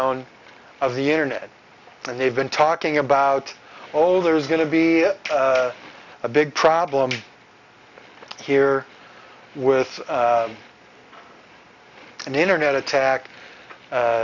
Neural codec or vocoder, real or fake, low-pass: none; real; 7.2 kHz